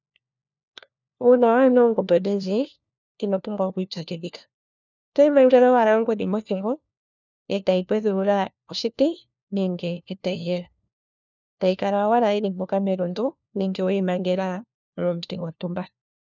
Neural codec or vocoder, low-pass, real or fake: codec, 16 kHz, 1 kbps, FunCodec, trained on LibriTTS, 50 frames a second; 7.2 kHz; fake